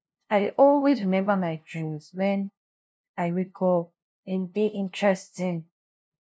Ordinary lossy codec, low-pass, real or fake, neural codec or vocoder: none; none; fake; codec, 16 kHz, 0.5 kbps, FunCodec, trained on LibriTTS, 25 frames a second